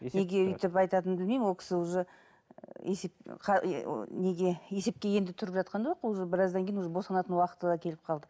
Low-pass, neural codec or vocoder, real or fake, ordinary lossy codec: none; none; real; none